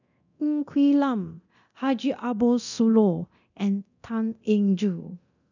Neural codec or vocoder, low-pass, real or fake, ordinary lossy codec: codec, 24 kHz, 0.9 kbps, DualCodec; 7.2 kHz; fake; none